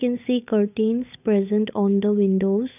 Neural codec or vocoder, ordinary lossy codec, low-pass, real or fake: codec, 16 kHz, 8 kbps, FunCodec, trained on Chinese and English, 25 frames a second; none; 3.6 kHz; fake